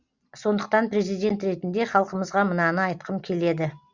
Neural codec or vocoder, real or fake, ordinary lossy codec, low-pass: none; real; Opus, 64 kbps; 7.2 kHz